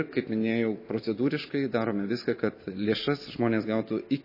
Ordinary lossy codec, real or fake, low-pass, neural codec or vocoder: MP3, 24 kbps; real; 5.4 kHz; none